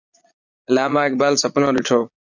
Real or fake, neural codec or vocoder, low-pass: fake; vocoder, 22.05 kHz, 80 mel bands, Vocos; 7.2 kHz